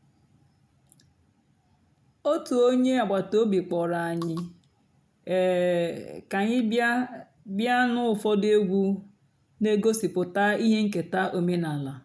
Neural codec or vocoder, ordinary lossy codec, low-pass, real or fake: none; none; none; real